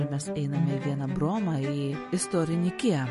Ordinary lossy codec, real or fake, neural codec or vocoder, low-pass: MP3, 48 kbps; real; none; 14.4 kHz